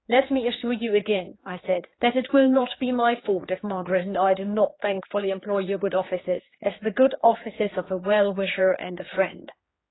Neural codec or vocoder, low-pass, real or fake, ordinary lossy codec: codec, 16 kHz, 4 kbps, X-Codec, HuBERT features, trained on general audio; 7.2 kHz; fake; AAC, 16 kbps